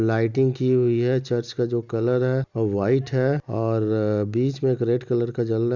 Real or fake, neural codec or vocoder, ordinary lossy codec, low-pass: real; none; none; 7.2 kHz